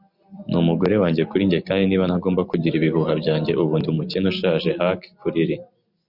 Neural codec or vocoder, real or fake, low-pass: none; real; 5.4 kHz